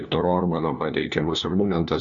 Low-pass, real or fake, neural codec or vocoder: 7.2 kHz; fake; codec, 16 kHz, 1 kbps, FunCodec, trained on LibriTTS, 50 frames a second